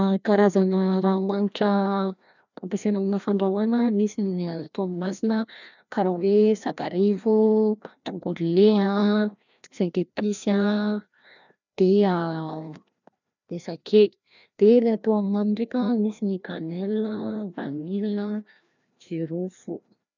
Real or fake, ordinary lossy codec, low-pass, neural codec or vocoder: fake; none; 7.2 kHz; codec, 16 kHz, 1 kbps, FreqCodec, larger model